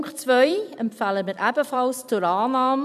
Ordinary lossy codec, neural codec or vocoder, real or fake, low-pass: none; vocoder, 44.1 kHz, 128 mel bands every 256 samples, BigVGAN v2; fake; 14.4 kHz